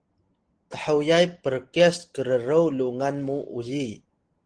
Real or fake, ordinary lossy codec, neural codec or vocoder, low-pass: real; Opus, 16 kbps; none; 9.9 kHz